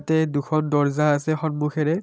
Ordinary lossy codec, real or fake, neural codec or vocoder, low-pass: none; real; none; none